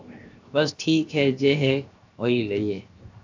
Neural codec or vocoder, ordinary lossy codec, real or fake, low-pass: codec, 16 kHz, 0.7 kbps, FocalCodec; AAC, 48 kbps; fake; 7.2 kHz